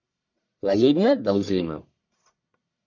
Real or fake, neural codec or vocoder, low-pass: fake; codec, 44.1 kHz, 1.7 kbps, Pupu-Codec; 7.2 kHz